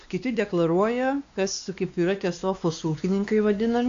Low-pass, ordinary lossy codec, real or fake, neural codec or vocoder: 7.2 kHz; AAC, 64 kbps; fake; codec, 16 kHz, 2 kbps, X-Codec, WavLM features, trained on Multilingual LibriSpeech